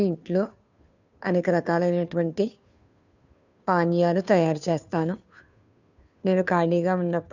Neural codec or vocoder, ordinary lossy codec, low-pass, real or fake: codec, 16 kHz, 2 kbps, FunCodec, trained on Chinese and English, 25 frames a second; none; 7.2 kHz; fake